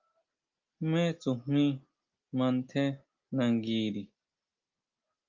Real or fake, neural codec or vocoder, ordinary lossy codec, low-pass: real; none; Opus, 32 kbps; 7.2 kHz